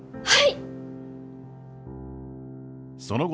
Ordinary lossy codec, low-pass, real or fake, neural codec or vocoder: none; none; real; none